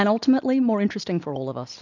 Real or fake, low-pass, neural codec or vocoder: real; 7.2 kHz; none